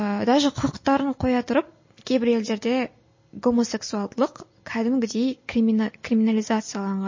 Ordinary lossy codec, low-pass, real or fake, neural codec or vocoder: MP3, 32 kbps; 7.2 kHz; real; none